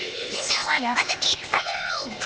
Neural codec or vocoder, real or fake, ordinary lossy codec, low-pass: codec, 16 kHz, 0.8 kbps, ZipCodec; fake; none; none